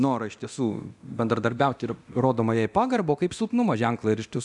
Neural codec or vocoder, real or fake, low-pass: codec, 24 kHz, 0.9 kbps, DualCodec; fake; 10.8 kHz